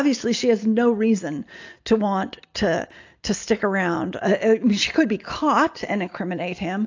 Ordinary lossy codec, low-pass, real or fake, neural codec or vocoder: AAC, 48 kbps; 7.2 kHz; real; none